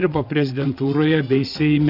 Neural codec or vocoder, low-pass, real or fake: vocoder, 22.05 kHz, 80 mel bands, WaveNeXt; 5.4 kHz; fake